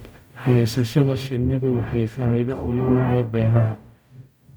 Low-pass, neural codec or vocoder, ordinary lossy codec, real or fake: none; codec, 44.1 kHz, 0.9 kbps, DAC; none; fake